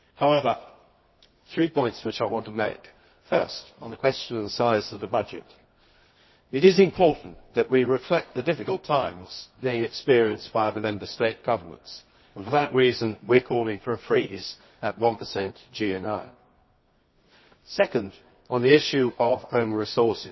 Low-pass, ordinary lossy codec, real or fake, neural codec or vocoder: 7.2 kHz; MP3, 24 kbps; fake; codec, 24 kHz, 0.9 kbps, WavTokenizer, medium music audio release